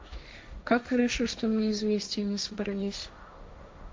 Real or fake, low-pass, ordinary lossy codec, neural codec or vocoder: fake; none; none; codec, 16 kHz, 1.1 kbps, Voila-Tokenizer